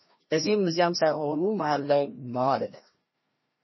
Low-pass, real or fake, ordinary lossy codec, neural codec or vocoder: 7.2 kHz; fake; MP3, 24 kbps; codec, 16 kHz, 1 kbps, FreqCodec, larger model